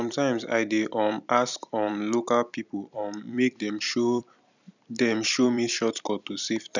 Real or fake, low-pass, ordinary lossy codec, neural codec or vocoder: fake; 7.2 kHz; none; vocoder, 44.1 kHz, 128 mel bands every 256 samples, BigVGAN v2